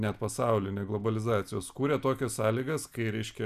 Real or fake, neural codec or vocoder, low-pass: real; none; 14.4 kHz